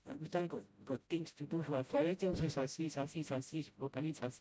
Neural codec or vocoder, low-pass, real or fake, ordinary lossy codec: codec, 16 kHz, 0.5 kbps, FreqCodec, smaller model; none; fake; none